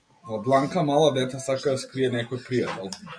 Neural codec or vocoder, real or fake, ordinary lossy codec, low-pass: none; real; MP3, 64 kbps; 9.9 kHz